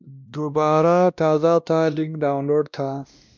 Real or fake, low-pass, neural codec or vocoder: fake; 7.2 kHz; codec, 16 kHz, 1 kbps, X-Codec, WavLM features, trained on Multilingual LibriSpeech